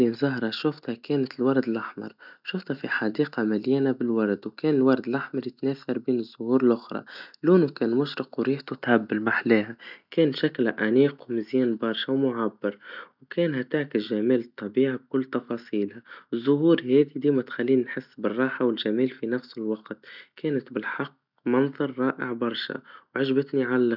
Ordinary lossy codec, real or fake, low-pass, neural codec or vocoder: none; real; 5.4 kHz; none